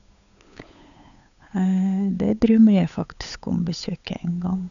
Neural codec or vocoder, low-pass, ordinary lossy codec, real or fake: codec, 16 kHz, 8 kbps, FunCodec, trained on Chinese and English, 25 frames a second; 7.2 kHz; none; fake